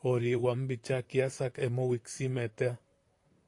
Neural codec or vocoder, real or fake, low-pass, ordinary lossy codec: vocoder, 44.1 kHz, 128 mel bands, Pupu-Vocoder; fake; 10.8 kHz; AAC, 48 kbps